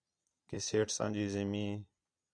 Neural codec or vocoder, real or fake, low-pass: vocoder, 22.05 kHz, 80 mel bands, Vocos; fake; 9.9 kHz